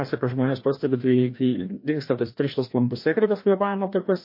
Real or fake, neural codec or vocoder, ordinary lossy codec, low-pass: fake; codec, 16 kHz, 1 kbps, FreqCodec, larger model; MP3, 32 kbps; 5.4 kHz